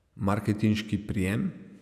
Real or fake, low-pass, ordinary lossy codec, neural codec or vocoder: fake; 14.4 kHz; none; vocoder, 48 kHz, 128 mel bands, Vocos